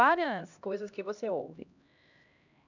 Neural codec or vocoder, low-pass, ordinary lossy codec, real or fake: codec, 16 kHz, 1 kbps, X-Codec, HuBERT features, trained on LibriSpeech; 7.2 kHz; none; fake